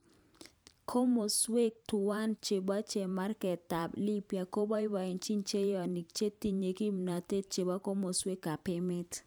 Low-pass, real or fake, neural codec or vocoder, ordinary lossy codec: none; fake; vocoder, 44.1 kHz, 128 mel bands every 512 samples, BigVGAN v2; none